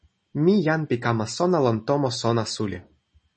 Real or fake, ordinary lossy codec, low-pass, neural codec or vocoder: real; MP3, 32 kbps; 9.9 kHz; none